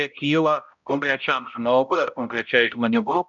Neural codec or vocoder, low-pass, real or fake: codec, 16 kHz, 0.5 kbps, X-Codec, HuBERT features, trained on balanced general audio; 7.2 kHz; fake